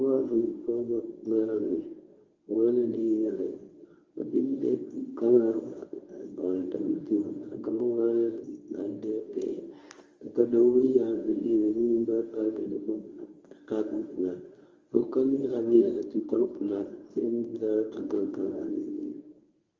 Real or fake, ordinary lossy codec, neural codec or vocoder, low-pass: fake; Opus, 32 kbps; codec, 24 kHz, 0.9 kbps, WavTokenizer, medium speech release version 1; 7.2 kHz